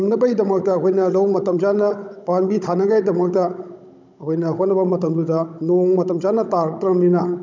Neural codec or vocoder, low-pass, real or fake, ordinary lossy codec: codec, 16 kHz, 16 kbps, FunCodec, trained on Chinese and English, 50 frames a second; 7.2 kHz; fake; none